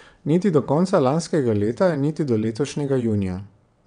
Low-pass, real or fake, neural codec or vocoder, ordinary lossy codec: 9.9 kHz; fake; vocoder, 22.05 kHz, 80 mel bands, WaveNeXt; none